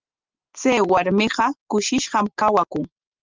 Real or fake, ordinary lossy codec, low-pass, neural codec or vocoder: real; Opus, 32 kbps; 7.2 kHz; none